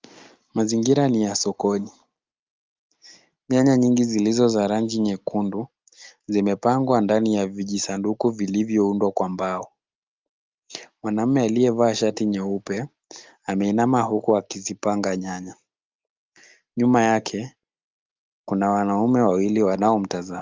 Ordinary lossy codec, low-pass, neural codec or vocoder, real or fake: Opus, 32 kbps; 7.2 kHz; none; real